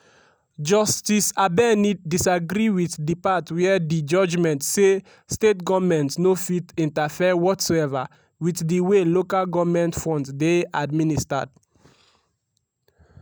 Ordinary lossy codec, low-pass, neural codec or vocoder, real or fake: none; none; none; real